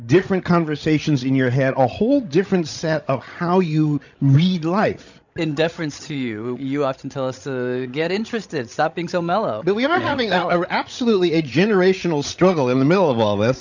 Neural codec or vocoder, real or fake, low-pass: codec, 16 kHz, 16 kbps, FreqCodec, larger model; fake; 7.2 kHz